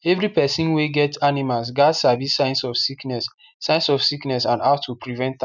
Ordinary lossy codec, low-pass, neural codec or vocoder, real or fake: none; 7.2 kHz; none; real